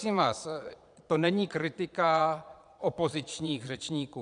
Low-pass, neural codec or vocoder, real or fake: 9.9 kHz; vocoder, 22.05 kHz, 80 mel bands, Vocos; fake